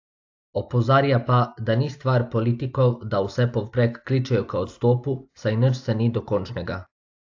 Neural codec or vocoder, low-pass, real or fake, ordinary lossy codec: none; 7.2 kHz; real; AAC, 48 kbps